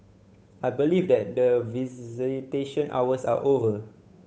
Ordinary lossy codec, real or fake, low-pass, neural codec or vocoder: none; fake; none; codec, 16 kHz, 8 kbps, FunCodec, trained on Chinese and English, 25 frames a second